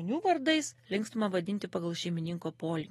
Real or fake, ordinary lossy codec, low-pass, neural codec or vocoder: real; AAC, 32 kbps; 19.8 kHz; none